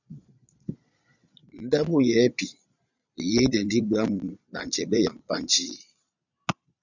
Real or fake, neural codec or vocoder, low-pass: fake; vocoder, 22.05 kHz, 80 mel bands, Vocos; 7.2 kHz